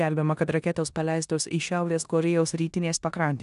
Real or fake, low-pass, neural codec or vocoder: fake; 10.8 kHz; codec, 16 kHz in and 24 kHz out, 0.9 kbps, LongCat-Audio-Codec, four codebook decoder